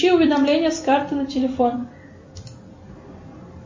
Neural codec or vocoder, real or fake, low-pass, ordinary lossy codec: none; real; 7.2 kHz; MP3, 32 kbps